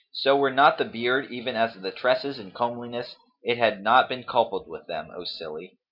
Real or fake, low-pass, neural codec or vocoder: real; 5.4 kHz; none